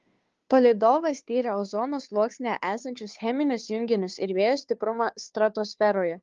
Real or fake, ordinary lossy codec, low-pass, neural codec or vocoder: fake; Opus, 32 kbps; 7.2 kHz; codec, 16 kHz, 2 kbps, FunCodec, trained on Chinese and English, 25 frames a second